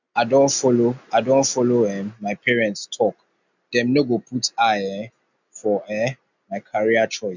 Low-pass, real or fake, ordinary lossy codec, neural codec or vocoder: 7.2 kHz; real; none; none